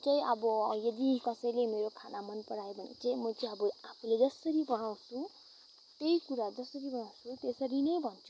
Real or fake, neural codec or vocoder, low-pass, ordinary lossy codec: real; none; none; none